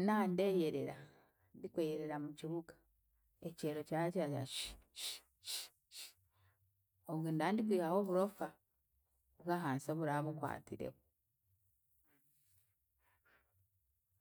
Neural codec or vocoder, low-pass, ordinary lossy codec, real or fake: none; none; none; real